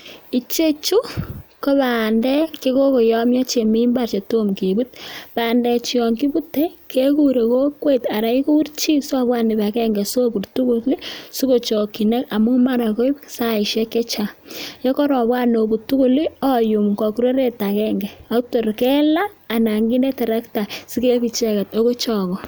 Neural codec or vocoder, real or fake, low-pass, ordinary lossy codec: none; real; none; none